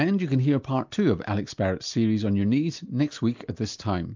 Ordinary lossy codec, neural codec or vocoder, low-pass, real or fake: MP3, 64 kbps; none; 7.2 kHz; real